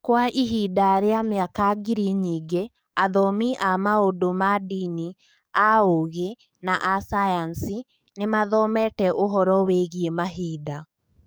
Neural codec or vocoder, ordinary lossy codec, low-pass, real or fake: codec, 44.1 kHz, 7.8 kbps, DAC; none; none; fake